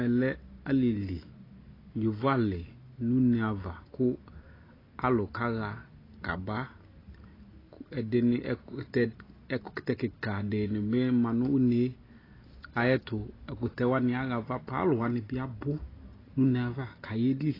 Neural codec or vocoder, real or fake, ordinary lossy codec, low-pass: none; real; AAC, 24 kbps; 5.4 kHz